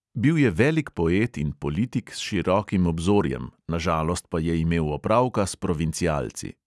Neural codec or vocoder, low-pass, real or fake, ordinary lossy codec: none; none; real; none